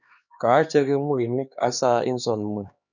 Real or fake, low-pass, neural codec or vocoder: fake; 7.2 kHz; codec, 16 kHz, 2 kbps, X-Codec, HuBERT features, trained on LibriSpeech